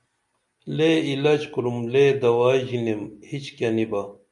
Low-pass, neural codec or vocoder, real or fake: 10.8 kHz; none; real